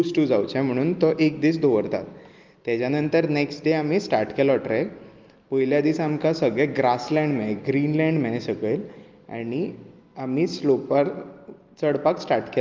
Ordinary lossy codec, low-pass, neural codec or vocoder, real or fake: Opus, 32 kbps; 7.2 kHz; none; real